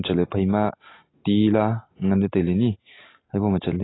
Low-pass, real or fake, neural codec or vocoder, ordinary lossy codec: 7.2 kHz; real; none; AAC, 16 kbps